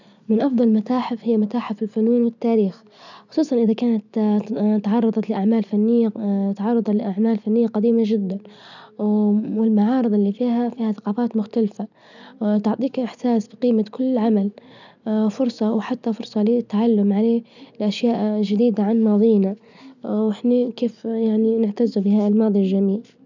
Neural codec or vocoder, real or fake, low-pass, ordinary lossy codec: none; real; 7.2 kHz; none